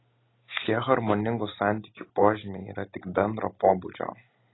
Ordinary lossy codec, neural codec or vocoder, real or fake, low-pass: AAC, 16 kbps; none; real; 7.2 kHz